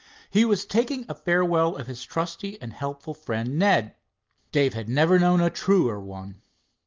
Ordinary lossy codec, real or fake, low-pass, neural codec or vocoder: Opus, 24 kbps; real; 7.2 kHz; none